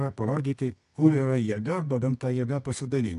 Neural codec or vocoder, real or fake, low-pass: codec, 24 kHz, 0.9 kbps, WavTokenizer, medium music audio release; fake; 10.8 kHz